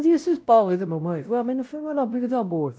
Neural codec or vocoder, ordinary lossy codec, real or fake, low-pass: codec, 16 kHz, 0.5 kbps, X-Codec, WavLM features, trained on Multilingual LibriSpeech; none; fake; none